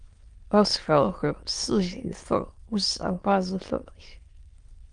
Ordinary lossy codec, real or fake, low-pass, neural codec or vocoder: Opus, 24 kbps; fake; 9.9 kHz; autoencoder, 22.05 kHz, a latent of 192 numbers a frame, VITS, trained on many speakers